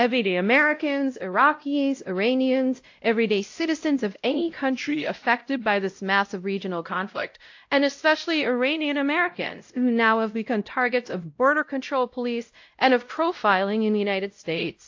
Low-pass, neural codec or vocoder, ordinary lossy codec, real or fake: 7.2 kHz; codec, 16 kHz, 0.5 kbps, X-Codec, WavLM features, trained on Multilingual LibriSpeech; AAC, 48 kbps; fake